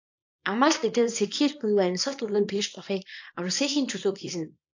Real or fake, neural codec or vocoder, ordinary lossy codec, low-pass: fake; codec, 24 kHz, 0.9 kbps, WavTokenizer, small release; AAC, 48 kbps; 7.2 kHz